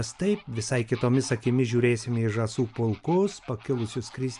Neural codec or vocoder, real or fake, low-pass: none; real; 10.8 kHz